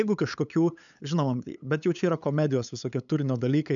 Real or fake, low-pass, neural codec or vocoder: fake; 7.2 kHz; codec, 16 kHz, 8 kbps, FunCodec, trained on LibriTTS, 25 frames a second